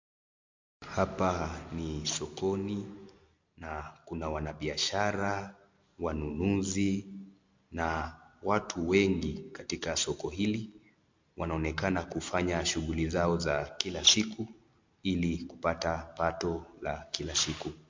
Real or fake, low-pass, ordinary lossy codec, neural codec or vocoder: real; 7.2 kHz; MP3, 48 kbps; none